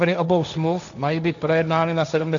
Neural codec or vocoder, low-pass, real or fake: codec, 16 kHz, 1.1 kbps, Voila-Tokenizer; 7.2 kHz; fake